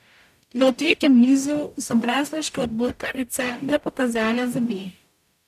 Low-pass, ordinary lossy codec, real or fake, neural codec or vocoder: 14.4 kHz; none; fake; codec, 44.1 kHz, 0.9 kbps, DAC